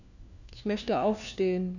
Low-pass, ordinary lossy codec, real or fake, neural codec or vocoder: 7.2 kHz; none; fake; codec, 16 kHz, 1 kbps, FunCodec, trained on LibriTTS, 50 frames a second